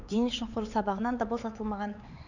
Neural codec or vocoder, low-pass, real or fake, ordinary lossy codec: codec, 16 kHz, 4 kbps, X-Codec, HuBERT features, trained on LibriSpeech; 7.2 kHz; fake; none